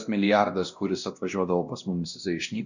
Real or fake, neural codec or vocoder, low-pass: fake; codec, 16 kHz, 1 kbps, X-Codec, WavLM features, trained on Multilingual LibriSpeech; 7.2 kHz